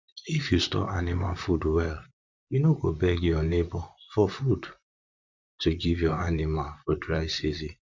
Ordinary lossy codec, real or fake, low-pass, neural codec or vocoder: MP3, 64 kbps; fake; 7.2 kHz; vocoder, 44.1 kHz, 128 mel bands, Pupu-Vocoder